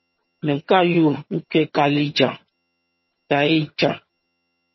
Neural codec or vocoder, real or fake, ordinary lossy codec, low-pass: vocoder, 22.05 kHz, 80 mel bands, HiFi-GAN; fake; MP3, 24 kbps; 7.2 kHz